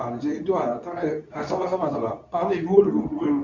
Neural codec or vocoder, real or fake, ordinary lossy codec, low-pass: codec, 24 kHz, 0.9 kbps, WavTokenizer, medium speech release version 2; fake; none; 7.2 kHz